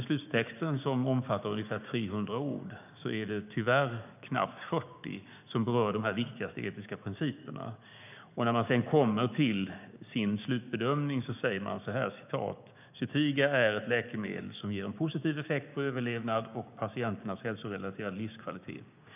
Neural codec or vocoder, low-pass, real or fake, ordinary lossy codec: codec, 16 kHz, 6 kbps, DAC; 3.6 kHz; fake; none